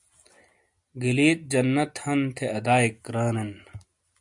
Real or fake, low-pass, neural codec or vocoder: real; 10.8 kHz; none